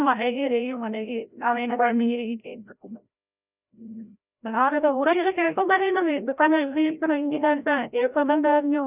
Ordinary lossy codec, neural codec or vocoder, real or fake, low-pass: none; codec, 16 kHz, 0.5 kbps, FreqCodec, larger model; fake; 3.6 kHz